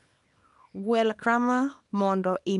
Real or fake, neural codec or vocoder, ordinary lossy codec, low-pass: fake; codec, 24 kHz, 0.9 kbps, WavTokenizer, small release; none; 10.8 kHz